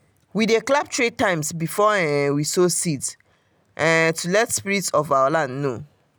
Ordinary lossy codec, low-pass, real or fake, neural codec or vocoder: none; none; real; none